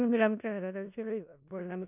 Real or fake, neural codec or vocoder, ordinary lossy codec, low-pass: fake; codec, 16 kHz in and 24 kHz out, 0.4 kbps, LongCat-Audio-Codec, four codebook decoder; none; 3.6 kHz